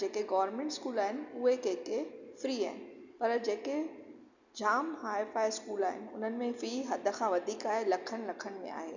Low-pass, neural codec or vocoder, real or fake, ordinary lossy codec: 7.2 kHz; none; real; none